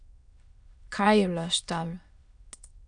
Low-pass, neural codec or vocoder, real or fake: 9.9 kHz; autoencoder, 22.05 kHz, a latent of 192 numbers a frame, VITS, trained on many speakers; fake